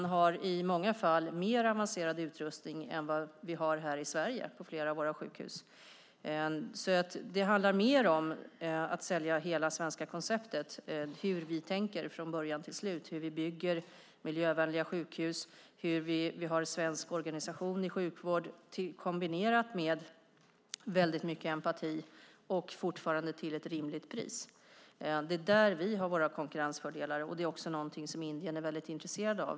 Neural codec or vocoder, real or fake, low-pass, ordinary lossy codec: none; real; none; none